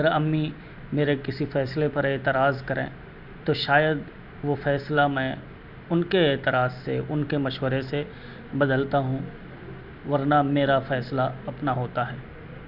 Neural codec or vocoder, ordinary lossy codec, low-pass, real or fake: none; none; 5.4 kHz; real